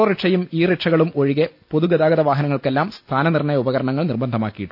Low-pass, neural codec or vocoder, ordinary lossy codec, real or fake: 5.4 kHz; none; AAC, 48 kbps; real